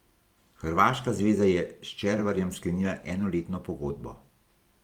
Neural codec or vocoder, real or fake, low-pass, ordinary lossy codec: vocoder, 44.1 kHz, 128 mel bands every 512 samples, BigVGAN v2; fake; 19.8 kHz; Opus, 32 kbps